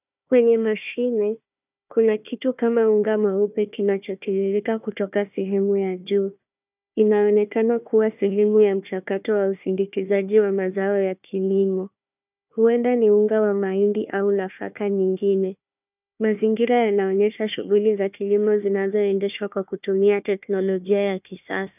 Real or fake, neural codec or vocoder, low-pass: fake; codec, 16 kHz, 1 kbps, FunCodec, trained on Chinese and English, 50 frames a second; 3.6 kHz